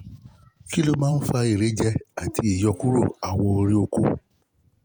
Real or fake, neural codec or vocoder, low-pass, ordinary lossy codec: fake; vocoder, 48 kHz, 128 mel bands, Vocos; none; none